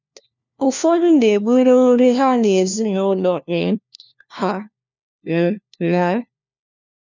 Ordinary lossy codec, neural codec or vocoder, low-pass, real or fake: none; codec, 16 kHz, 1 kbps, FunCodec, trained on LibriTTS, 50 frames a second; 7.2 kHz; fake